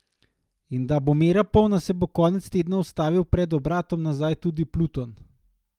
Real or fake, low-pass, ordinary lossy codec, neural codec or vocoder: real; 19.8 kHz; Opus, 32 kbps; none